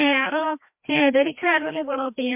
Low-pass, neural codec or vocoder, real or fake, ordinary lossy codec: 3.6 kHz; codec, 16 kHz, 1 kbps, FreqCodec, larger model; fake; MP3, 32 kbps